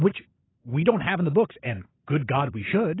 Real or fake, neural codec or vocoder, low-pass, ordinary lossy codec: fake; vocoder, 44.1 kHz, 128 mel bands every 256 samples, BigVGAN v2; 7.2 kHz; AAC, 16 kbps